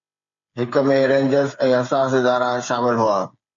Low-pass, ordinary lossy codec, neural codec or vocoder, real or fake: 7.2 kHz; MP3, 64 kbps; codec, 16 kHz, 8 kbps, FreqCodec, smaller model; fake